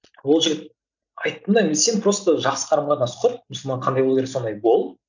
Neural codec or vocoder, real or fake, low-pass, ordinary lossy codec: none; real; 7.2 kHz; none